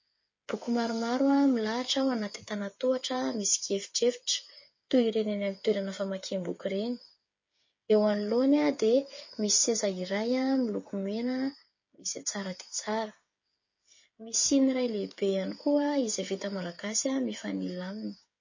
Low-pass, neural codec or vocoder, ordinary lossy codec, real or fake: 7.2 kHz; codec, 16 kHz, 8 kbps, FreqCodec, smaller model; MP3, 32 kbps; fake